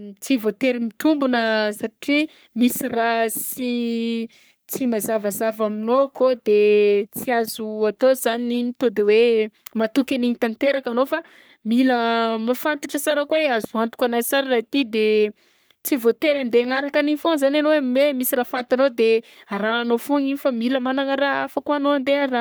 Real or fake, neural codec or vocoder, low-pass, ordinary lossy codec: fake; codec, 44.1 kHz, 3.4 kbps, Pupu-Codec; none; none